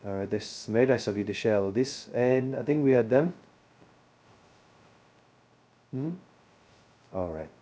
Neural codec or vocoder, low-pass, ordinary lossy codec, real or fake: codec, 16 kHz, 0.2 kbps, FocalCodec; none; none; fake